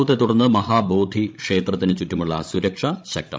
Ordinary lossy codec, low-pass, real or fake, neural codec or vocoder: none; none; fake; codec, 16 kHz, 16 kbps, FreqCodec, larger model